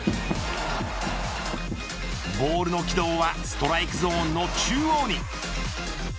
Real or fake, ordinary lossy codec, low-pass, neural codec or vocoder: real; none; none; none